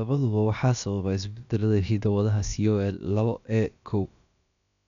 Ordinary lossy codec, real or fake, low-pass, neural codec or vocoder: none; fake; 7.2 kHz; codec, 16 kHz, about 1 kbps, DyCAST, with the encoder's durations